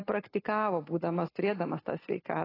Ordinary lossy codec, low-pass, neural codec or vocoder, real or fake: AAC, 24 kbps; 5.4 kHz; none; real